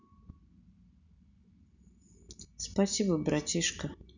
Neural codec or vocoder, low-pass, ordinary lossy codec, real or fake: none; 7.2 kHz; none; real